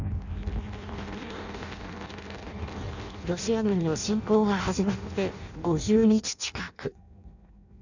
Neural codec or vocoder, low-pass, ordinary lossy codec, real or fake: codec, 16 kHz in and 24 kHz out, 0.6 kbps, FireRedTTS-2 codec; 7.2 kHz; none; fake